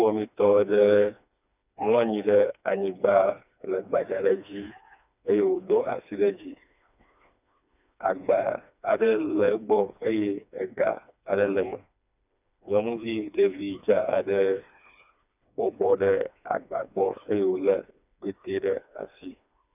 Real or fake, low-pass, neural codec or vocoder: fake; 3.6 kHz; codec, 16 kHz, 2 kbps, FreqCodec, smaller model